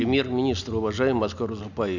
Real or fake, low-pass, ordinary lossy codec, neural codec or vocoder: real; 7.2 kHz; none; none